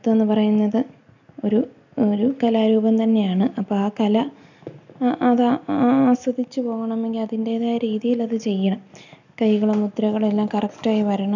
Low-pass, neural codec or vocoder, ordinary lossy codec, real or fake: 7.2 kHz; none; none; real